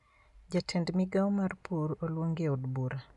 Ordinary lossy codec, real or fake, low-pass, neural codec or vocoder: none; real; 10.8 kHz; none